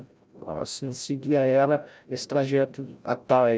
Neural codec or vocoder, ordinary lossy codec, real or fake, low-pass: codec, 16 kHz, 0.5 kbps, FreqCodec, larger model; none; fake; none